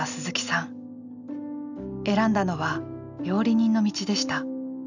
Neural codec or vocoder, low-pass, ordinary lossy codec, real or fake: none; 7.2 kHz; none; real